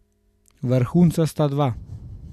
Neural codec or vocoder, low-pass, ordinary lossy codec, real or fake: none; 14.4 kHz; none; real